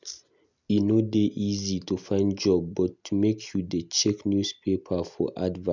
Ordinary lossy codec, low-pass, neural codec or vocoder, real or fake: none; 7.2 kHz; none; real